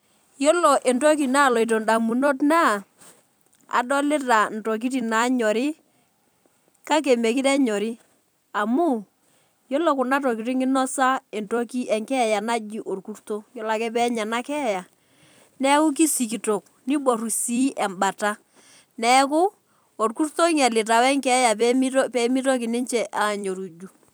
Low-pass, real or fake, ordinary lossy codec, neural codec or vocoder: none; fake; none; vocoder, 44.1 kHz, 128 mel bands every 256 samples, BigVGAN v2